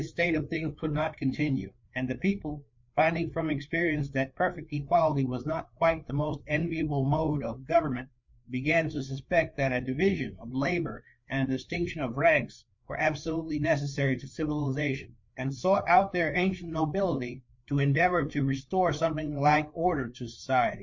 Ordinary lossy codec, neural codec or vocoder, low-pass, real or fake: MP3, 48 kbps; codec, 16 kHz, 4 kbps, FreqCodec, larger model; 7.2 kHz; fake